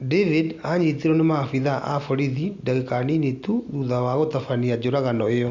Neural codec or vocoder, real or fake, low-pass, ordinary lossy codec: none; real; 7.2 kHz; Opus, 64 kbps